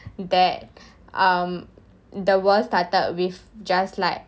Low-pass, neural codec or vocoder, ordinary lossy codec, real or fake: none; none; none; real